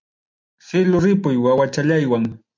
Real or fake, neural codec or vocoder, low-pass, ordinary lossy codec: real; none; 7.2 kHz; MP3, 48 kbps